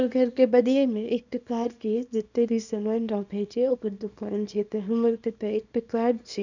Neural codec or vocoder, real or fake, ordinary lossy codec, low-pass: codec, 24 kHz, 0.9 kbps, WavTokenizer, small release; fake; none; 7.2 kHz